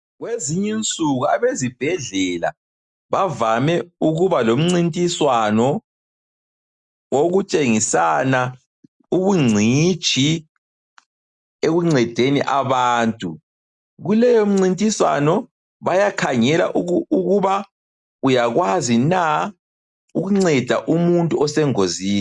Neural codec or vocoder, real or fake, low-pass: none; real; 10.8 kHz